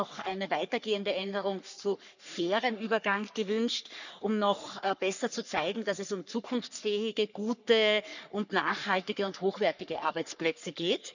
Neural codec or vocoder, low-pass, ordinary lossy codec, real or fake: codec, 44.1 kHz, 3.4 kbps, Pupu-Codec; 7.2 kHz; none; fake